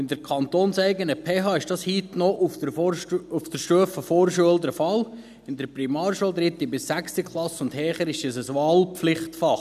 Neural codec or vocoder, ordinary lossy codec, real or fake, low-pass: none; none; real; 14.4 kHz